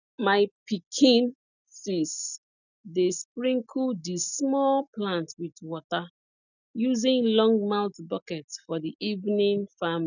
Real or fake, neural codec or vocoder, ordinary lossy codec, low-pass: real; none; none; 7.2 kHz